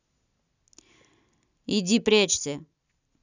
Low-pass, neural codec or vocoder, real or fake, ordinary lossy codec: 7.2 kHz; none; real; none